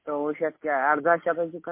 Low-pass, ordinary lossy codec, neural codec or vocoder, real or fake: 3.6 kHz; MP3, 24 kbps; none; real